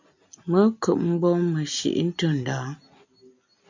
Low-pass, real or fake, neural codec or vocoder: 7.2 kHz; real; none